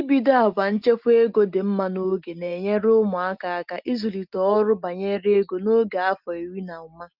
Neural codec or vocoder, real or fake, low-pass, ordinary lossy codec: none; real; 5.4 kHz; Opus, 24 kbps